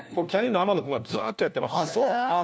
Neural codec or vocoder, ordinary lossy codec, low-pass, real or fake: codec, 16 kHz, 1 kbps, FunCodec, trained on LibriTTS, 50 frames a second; none; none; fake